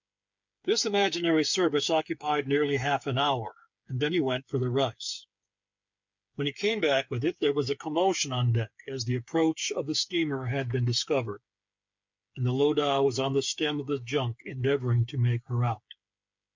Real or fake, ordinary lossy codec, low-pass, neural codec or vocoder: fake; MP3, 64 kbps; 7.2 kHz; codec, 16 kHz, 8 kbps, FreqCodec, smaller model